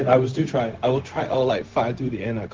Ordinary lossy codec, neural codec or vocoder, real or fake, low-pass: Opus, 16 kbps; codec, 16 kHz, 0.4 kbps, LongCat-Audio-Codec; fake; 7.2 kHz